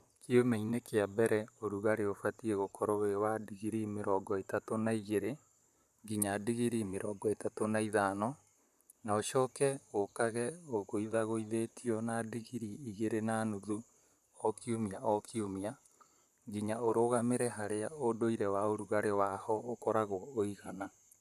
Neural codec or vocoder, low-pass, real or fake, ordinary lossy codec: vocoder, 44.1 kHz, 128 mel bands, Pupu-Vocoder; 14.4 kHz; fake; none